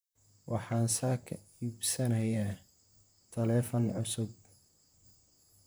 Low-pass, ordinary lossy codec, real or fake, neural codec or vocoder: none; none; fake; vocoder, 44.1 kHz, 128 mel bands, Pupu-Vocoder